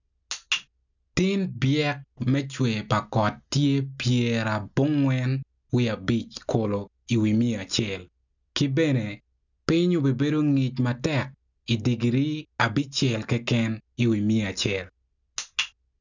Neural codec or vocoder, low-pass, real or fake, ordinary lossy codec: none; 7.2 kHz; real; none